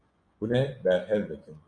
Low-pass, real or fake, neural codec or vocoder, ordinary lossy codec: 9.9 kHz; fake; vocoder, 24 kHz, 100 mel bands, Vocos; Opus, 64 kbps